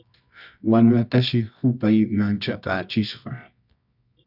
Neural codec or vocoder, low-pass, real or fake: codec, 24 kHz, 0.9 kbps, WavTokenizer, medium music audio release; 5.4 kHz; fake